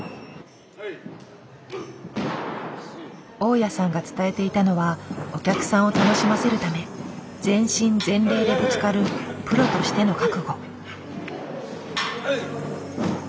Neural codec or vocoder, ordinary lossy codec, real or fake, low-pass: none; none; real; none